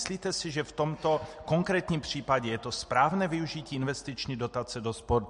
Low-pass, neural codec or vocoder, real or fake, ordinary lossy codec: 14.4 kHz; none; real; MP3, 48 kbps